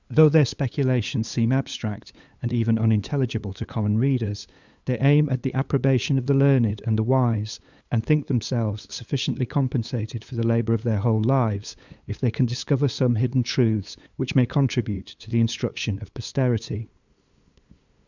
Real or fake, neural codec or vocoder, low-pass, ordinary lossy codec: fake; codec, 16 kHz, 8 kbps, FunCodec, trained on LibriTTS, 25 frames a second; 7.2 kHz; Opus, 64 kbps